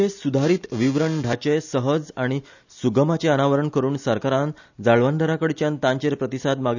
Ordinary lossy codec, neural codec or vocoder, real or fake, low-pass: none; none; real; 7.2 kHz